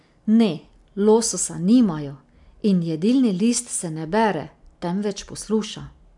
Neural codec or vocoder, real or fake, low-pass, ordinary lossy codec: none; real; 10.8 kHz; MP3, 96 kbps